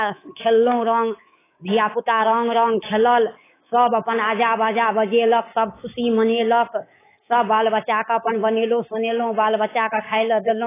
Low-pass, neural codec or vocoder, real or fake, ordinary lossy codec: 3.6 kHz; codec, 24 kHz, 3.1 kbps, DualCodec; fake; AAC, 16 kbps